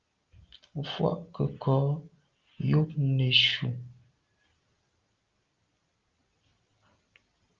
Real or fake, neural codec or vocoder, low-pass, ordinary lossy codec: real; none; 7.2 kHz; Opus, 32 kbps